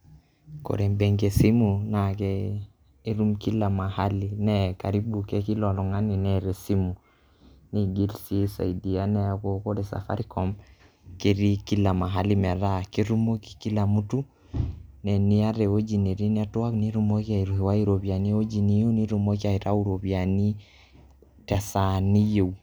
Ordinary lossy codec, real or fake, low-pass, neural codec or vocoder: none; real; none; none